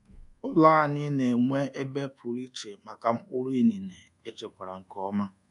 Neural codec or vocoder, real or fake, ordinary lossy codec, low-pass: codec, 24 kHz, 1.2 kbps, DualCodec; fake; none; 10.8 kHz